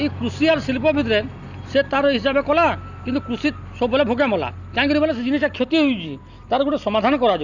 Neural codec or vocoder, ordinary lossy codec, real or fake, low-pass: none; Opus, 64 kbps; real; 7.2 kHz